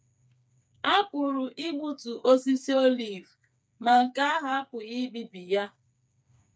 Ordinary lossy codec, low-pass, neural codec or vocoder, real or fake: none; none; codec, 16 kHz, 4 kbps, FreqCodec, smaller model; fake